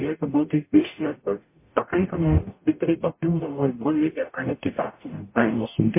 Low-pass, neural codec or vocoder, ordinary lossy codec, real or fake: 3.6 kHz; codec, 44.1 kHz, 0.9 kbps, DAC; MP3, 24 kbps; fake